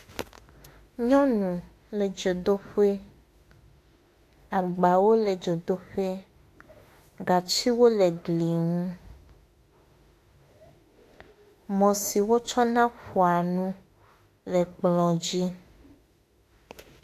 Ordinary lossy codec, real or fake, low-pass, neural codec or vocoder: AAC, 64 kbps; fake; 14.4 kHz; autoencoder, 48 kHz, 32 numbers a frame, DAC-VAE, trained on Japanese speech